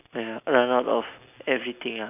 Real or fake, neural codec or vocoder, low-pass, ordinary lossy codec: real; none; 3.6 kHz; none